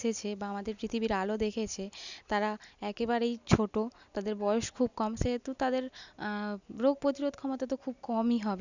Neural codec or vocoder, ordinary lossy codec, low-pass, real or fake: none; none; 7.2 kHz; real